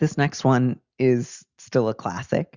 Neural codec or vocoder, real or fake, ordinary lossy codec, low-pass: none; real; Opus, 64 kbps; 7.2 kHz